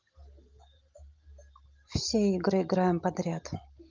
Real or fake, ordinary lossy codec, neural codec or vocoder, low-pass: real; Opus, 32 kbps; none; 7.2 kHz